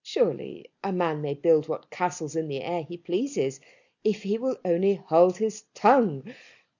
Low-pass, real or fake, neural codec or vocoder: 7.2 kHz; real; none